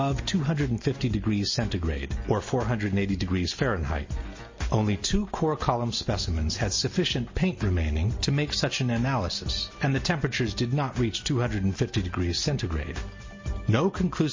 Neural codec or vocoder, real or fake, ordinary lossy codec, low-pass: none; real; MP3, 32 kbps; 7.2 kHz